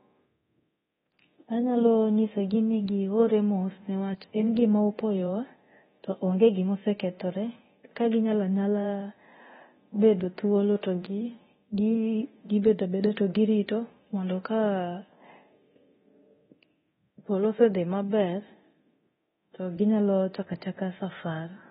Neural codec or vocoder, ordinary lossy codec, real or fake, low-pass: codec, 24 kHz, 0.9 kbps, DualCodec; AAC, 16 kbps; fake; 10.8 kHz